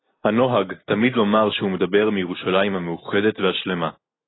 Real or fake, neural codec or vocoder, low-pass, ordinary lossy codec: real; none; 7.2 kHz; AAC, 16 kbps